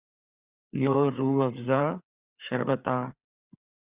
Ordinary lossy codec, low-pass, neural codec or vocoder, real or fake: Opus, 64 kbps; 3.6 kHz; codec, 16 kHz, 4 kbps, FreqCodec, larger model; fake